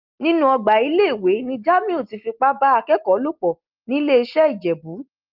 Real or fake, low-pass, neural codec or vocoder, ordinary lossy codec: real; 5.4 kHz; none; Opus, 32 kbps